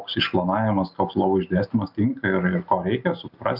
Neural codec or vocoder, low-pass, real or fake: none; 5.4 kHz; real